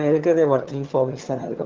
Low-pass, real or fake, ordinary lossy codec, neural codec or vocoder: 7.2 kHz; fake; Opus, 16 kbps; vocoder, 22.05 kHz, 80 mel bands, HiFi-GAN